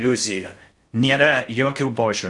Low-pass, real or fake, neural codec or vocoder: 10.8 kHz; fake; codec, 16 kHz in and 24 kHz out, 0.6 kbps, FocalCodec, streaming, 4096 codes